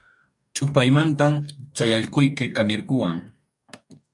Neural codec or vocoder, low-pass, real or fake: codec, 44.1 kHz, 2.6 kbps, DAC; 10.8 kHz; fake